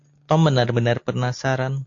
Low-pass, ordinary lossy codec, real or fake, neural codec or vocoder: 7.2 kHz; AAC, 48 kbps; real; none